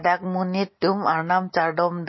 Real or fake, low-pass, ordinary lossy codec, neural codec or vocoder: real; 7.2 kHz; MP3, 24 kbps; none